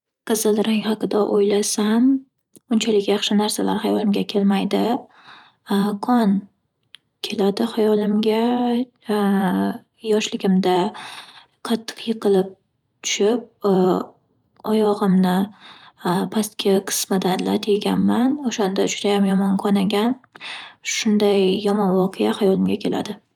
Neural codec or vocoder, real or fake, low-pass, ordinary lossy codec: vocoder, 44.1 kHz, 128 mel bands every 512 samples, BigVGAN v2; fake; 19.8 kHz; none